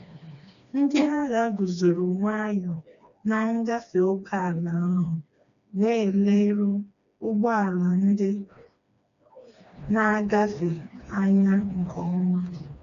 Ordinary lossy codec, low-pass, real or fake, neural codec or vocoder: none; 7.2 kHz; fake; codec, 16 kHz, 2 kbps, FreqCodec, smaller model